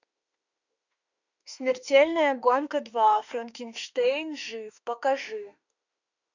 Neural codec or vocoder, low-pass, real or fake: autoencoder, 48 kHz, 32 numbers a frame, DAC-VAE, trained on Japanese speech; 7.2 kHz; fake